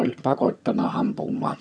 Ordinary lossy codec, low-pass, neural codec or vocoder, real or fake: none; none; vocoder, 22.05 kHz, 80 mel bands, HiFi-GAN; fake